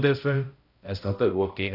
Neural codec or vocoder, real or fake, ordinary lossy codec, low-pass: codec, 16 kHz, 0.5 kbps, X-Codec, HuBERT features, trained on balanced general audio; fake; none; 5.4 kHz